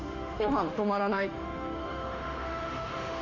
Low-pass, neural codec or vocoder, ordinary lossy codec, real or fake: 7.2 kHz; autoencoder, 48 kHz, 32 numbers a frame, DAC-VAE, trained on Japanese speech; none; fake